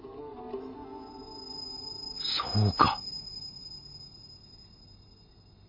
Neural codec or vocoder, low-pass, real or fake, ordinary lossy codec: none; 5.4 kHz; real; MP3, 48 kbps